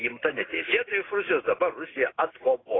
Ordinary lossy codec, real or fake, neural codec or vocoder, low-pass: AAC, 16 kbps; real; none; 7.2 kHz